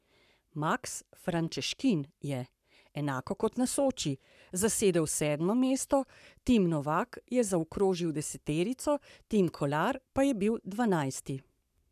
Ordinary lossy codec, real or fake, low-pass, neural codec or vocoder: none; fake; 14.4 kHz; codec, 44.1 kHz, 7.8 kbps, Pupu-Codec